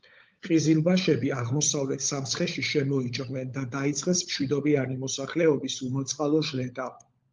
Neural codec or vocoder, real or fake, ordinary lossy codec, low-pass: codec, 16 kHz, 16 kbps, FunCodec, trained on LibriTTS, 50 frames a second; fake; Opus, 24 kbps; 7.2 kHz